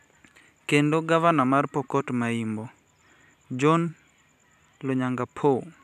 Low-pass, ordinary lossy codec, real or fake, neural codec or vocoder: 14.4 kHz; none; fake; vocoder, 44.1 kHz, 128 mel bands every 256 samples, BigVGAN v2